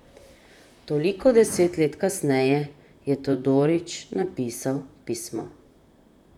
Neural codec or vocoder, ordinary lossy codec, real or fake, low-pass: vocoder, 44.1 kHz, 128 mel bands, Pupu-Vocoder; none; fake; 19.8 kHz